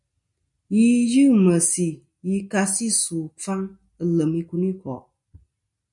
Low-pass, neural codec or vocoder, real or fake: 10.8 kHz; none; real